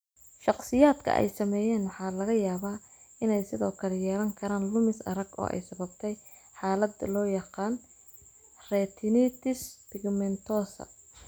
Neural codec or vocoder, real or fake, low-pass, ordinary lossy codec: none; real; none; none